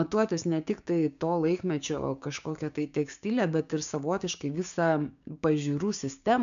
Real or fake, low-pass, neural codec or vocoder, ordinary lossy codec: fake; 7.2 kHz; codec, 16 kHz, 6 kbps, DAC; MP3, 96 kbps